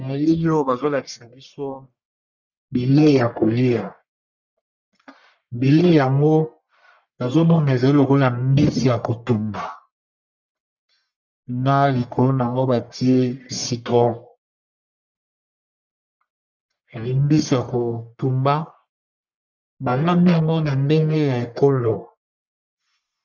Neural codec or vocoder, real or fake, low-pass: codec, 44.1 kHz, 1.7 kbps, Pupu-Codec; fake; 7.2 kHz